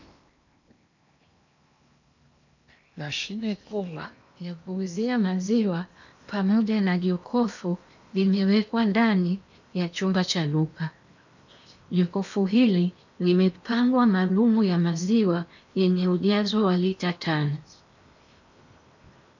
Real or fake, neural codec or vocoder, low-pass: fake; codec, 16 kHz in and 24 kHz out, 0.8 kbps, FocalCodec, streaming, 65536 codes; 7.2 kHz